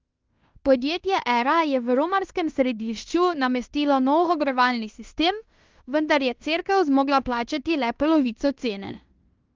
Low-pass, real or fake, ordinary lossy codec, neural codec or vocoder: 7.2 kHz; fake; Opus, 32 kbps; codec, 16 kHz in and 24 kHz out, 0.9 kbps, LongCat-Audio-Codec, fine tuned four codebook decoder